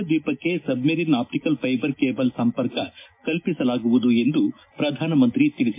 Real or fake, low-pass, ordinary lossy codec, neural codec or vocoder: real; 3.6 kHz; MP3, 24 kbps; none